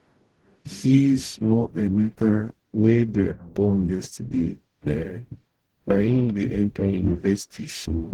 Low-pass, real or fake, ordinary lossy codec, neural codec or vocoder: 19.8 kHz; fake; Opus, 16 kbps; codec, 44.1 kHz, 0.9 kbps, DAC